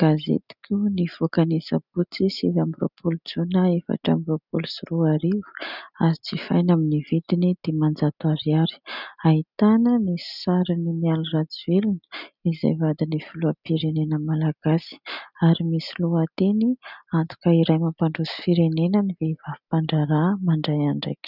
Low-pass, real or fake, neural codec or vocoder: 5.4 kHz; real; none